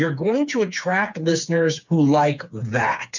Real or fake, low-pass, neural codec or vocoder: fake; 7.2 kHz; codec, 16 kHz, 4 kbps, FreqCodec, smaller model